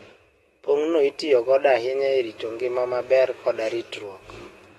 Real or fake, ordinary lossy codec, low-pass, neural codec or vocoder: fake; AAC, 32 kbps; 19.8 kHz; autoencoder, 48 kHz, 128 numbers a frame, DAC-VAE, trained on Japanese speech